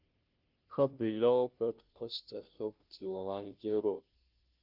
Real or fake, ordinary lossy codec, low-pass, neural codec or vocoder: fake; Opus, 24 kbps; 5.4 kHz; codec, 16 kHz, 0.5 kbps, FunCodec, trained on Chinese and English, 25 frames a second